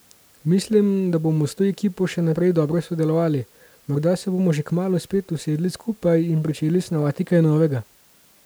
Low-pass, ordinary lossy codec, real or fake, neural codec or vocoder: none; none; real; none